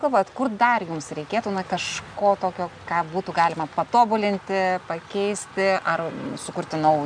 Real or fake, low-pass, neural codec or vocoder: real; 9.9 kHz; none